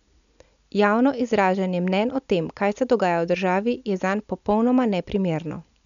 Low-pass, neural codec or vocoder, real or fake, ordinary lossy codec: 7.2 kHz; none; real; none